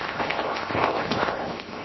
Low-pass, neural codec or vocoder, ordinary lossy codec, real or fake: 7.2 kHz; codec, 16 kHz, 0.7 kbps, FocalCodec; MP3, 24 kbps; fake